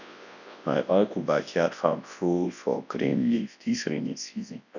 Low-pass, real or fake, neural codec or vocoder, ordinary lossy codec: 7.2 kHz; fake; codec, 24 kHz, 0.9 kbps, WavTokenizer, large speech release; none